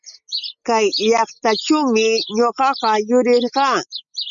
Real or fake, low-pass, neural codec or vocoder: real; 7.2 kHz; none